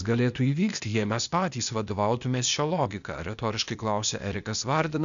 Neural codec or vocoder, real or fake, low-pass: codec, 16 kHz, 0.8 kbps, ZipCodec; fake; 7.2 kHz